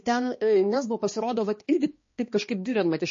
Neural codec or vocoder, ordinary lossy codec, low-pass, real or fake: codec, 16 kHz, 2 kbps, X-Codec, HuBERT features, trained on balanced general audio; MP3, 32 kbps; 7.2 kHz; fake